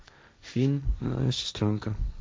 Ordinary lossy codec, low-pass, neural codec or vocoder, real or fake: none; none; codec, 16 kHz, 1.1 kbps, Voila-Tokenizer; fake